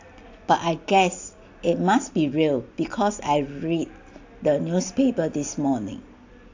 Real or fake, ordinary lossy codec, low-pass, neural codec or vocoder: real; AAC, 48 kbps; 7.2 kHz; none